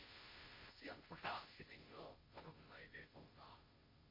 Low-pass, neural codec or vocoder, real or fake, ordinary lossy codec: 5.4 kHz; codec, 16 kHz, 0.5 kbps, FunCodec, trained on Chinese and English, 25 frames a second; fake; MP3, 24 kbps